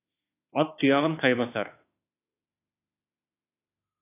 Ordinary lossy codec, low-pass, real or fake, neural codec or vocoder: AAC, 24 kbps; 3.6 kHz; fake; autoencoder, 48 kHz, 32 numbers a frame, DAC-VAE, trained on Japanese speech